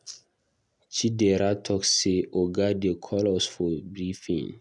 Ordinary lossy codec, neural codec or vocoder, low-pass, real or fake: none; none; 10.8 kHz; real